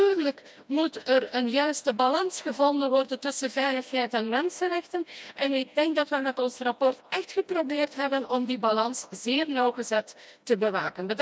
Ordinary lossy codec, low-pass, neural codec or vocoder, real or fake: none; none; codec, 16 kHz, 1 kbps, FreqCodec, smaller model; fake